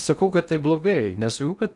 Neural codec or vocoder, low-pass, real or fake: codec, 16 kHz in and 24 kHz out, 0.8 kbps, FocalCodec, streaming, 65536 codes; 10.8 kHz; fake